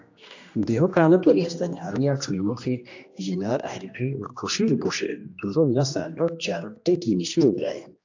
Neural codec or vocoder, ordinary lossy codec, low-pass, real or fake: codec, 16 kHz, 1 kbps, X-Codec, HuBERT features, trained on general audio; AAC, 48 kbps; 7.2 kHz; fake